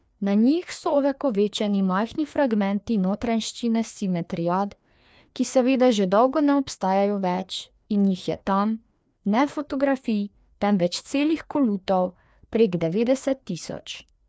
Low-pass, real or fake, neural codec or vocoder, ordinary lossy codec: none; fake; codec, 16 kHz, 2 kbps, FreqCodec, larger model; none